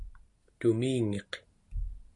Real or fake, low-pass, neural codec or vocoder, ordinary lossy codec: real; 10.8 kHz; none; MP3, 48 kbps